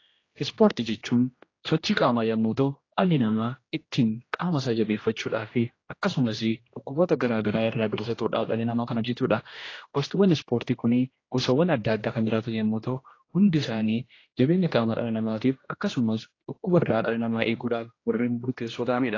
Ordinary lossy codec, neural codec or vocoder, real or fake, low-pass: AAC, 32 kbps; codec, 16 kHz, 1 kbps, X-Codec, HuBERT features, trained on general audio; fake; 7.2 kHz